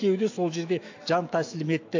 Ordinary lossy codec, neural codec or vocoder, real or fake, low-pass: AAC, 48 kbps; autoencoder, 48 kHz, 128 numbers a frame, DAC-VAE, trained on Japanese speech; fake; 7.2 kHz